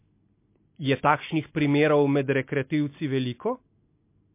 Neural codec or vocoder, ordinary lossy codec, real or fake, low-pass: none; MP3, 24 kbps; real; 3.6 kHz